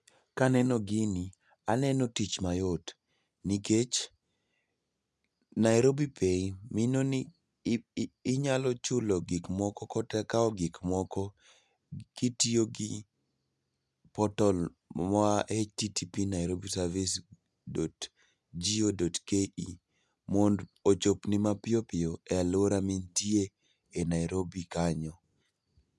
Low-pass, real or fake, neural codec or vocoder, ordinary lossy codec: none; real; none; none